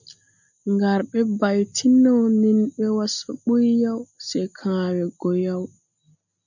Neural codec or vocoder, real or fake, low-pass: none; real; 7.2 kHz